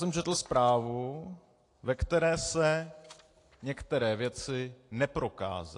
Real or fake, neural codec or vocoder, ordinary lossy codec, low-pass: real; none; AAC, 48 kbps; 10.8 kHz